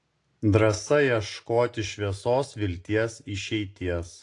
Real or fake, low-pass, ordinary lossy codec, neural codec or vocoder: real; 10.8 kHz; AAC, 48 kbps; none